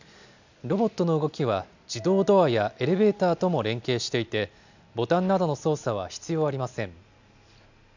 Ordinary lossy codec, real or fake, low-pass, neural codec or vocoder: none; real; 7.2 kHz; none